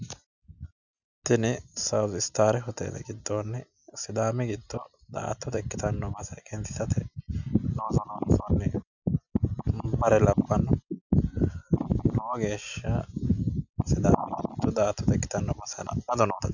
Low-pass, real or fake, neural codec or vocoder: 7.2 kHz; real; none